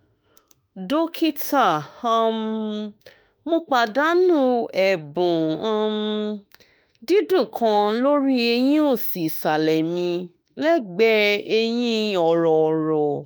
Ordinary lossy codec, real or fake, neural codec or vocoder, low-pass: none; fake; autoencoder, 48 kHz, 32 numbers a frame, DAC-VAE, trained on Japanese speech; none